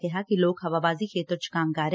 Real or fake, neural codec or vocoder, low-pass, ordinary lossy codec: real; none; none; none